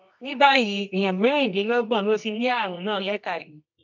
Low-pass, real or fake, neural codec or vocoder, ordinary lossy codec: 7.2 kHz; fake; codec, 24 kHz, 0.9 kbps, WavTokenizer, medium music audio release; none